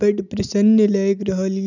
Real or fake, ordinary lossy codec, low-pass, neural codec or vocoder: real; none; 7.2 kHz; none